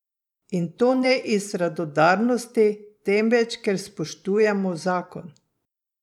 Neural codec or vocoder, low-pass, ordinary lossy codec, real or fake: vocoder, 44.1 kHz, 128 mel bands every 512 samples, BigVGAN v2; 19.8 kHz; none; fake